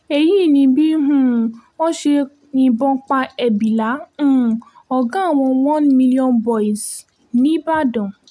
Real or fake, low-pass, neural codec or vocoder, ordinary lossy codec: real; none; none; none